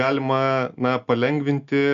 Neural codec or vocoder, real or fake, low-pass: none; real; 7.2 kHz